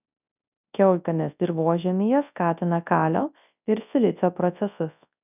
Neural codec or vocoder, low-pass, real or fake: codec, 24 kHz, 0.9 kbps, WavTokenizer, large speech release; 3.6 kHz; fake